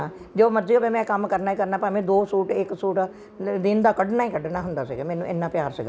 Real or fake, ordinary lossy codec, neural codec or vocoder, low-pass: real; none; none; none